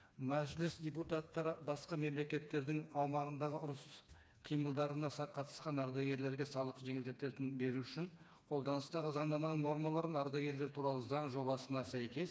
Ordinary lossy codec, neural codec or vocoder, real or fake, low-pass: none; codec, 16 kHz, 2 kbps, FreqCodec, smaller model; fake; none